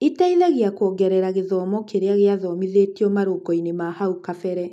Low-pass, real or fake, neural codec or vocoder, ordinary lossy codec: 14.4 kHz; real; none; none